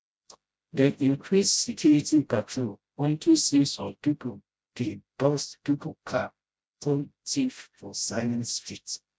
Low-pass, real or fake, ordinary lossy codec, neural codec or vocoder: none; fake; none; codec, 16 kHz, 0.5 kbps, FreqCodec, smaller model